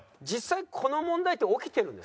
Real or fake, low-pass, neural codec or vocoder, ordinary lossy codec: real; none; none; none